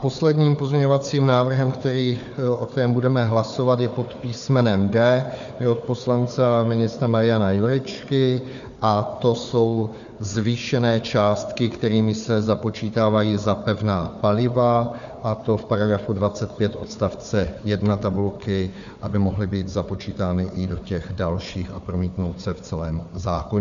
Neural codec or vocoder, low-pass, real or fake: codec, 16 kHz, 4 kbps, FunCodec, trained on Chinese and English, 50 frames a second; 7.2 kHz; fake